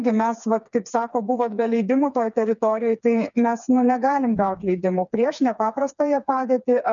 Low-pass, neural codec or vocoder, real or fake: 7.2 kHz; codec, 16 kHz, 4 kbps, FreqCodec, smaller model; fake